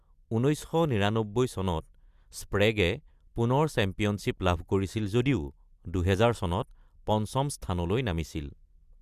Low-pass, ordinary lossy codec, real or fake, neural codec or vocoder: 14.4 kHz; Opus, 64 kbps; real; none